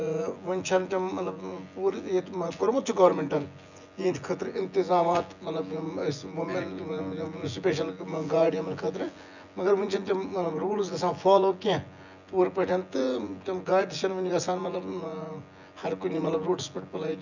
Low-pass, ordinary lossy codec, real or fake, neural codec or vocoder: 7.2 kHz; none; fake; vocoder, 24 kHz, 100 mel bands, Vocos